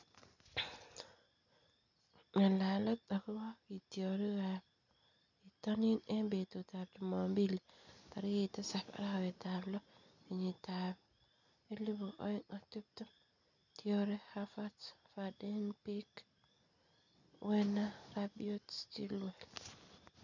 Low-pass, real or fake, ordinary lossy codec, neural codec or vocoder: 7.2 kHz; real; none; none